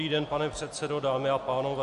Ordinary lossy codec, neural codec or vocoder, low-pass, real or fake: AAC, 64 kbps; none; 10.8 kHz; real